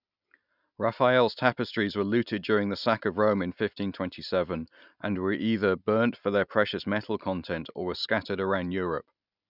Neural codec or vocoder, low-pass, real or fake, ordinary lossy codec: none; 5.4 kHz; real; none